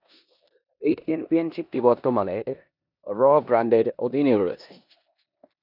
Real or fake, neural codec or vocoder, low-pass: fake; codec, 16 kHz in and 24 kHz out, 0.9 kbps, LongCat-Audio-Codec, four codebook decoder; 5.4 kHz